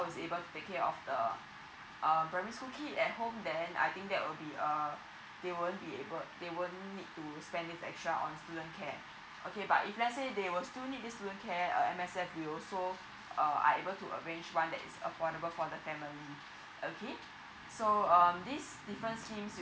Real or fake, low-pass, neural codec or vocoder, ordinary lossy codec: real; none; none; none